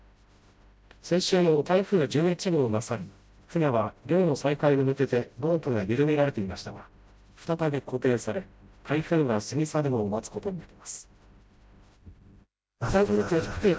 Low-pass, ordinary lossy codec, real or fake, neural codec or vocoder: none; none; fake; codec, 16 kHz, 0.5 kbps, FreqCodec, smaller model